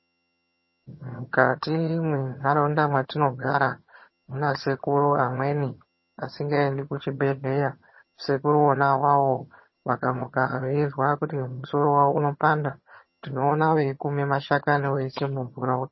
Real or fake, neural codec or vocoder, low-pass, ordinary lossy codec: fake; vocoder, 22.05 kHz, 80 mel bands, HiFi-GAN; 7.2 kHz; MP3, 24 kbps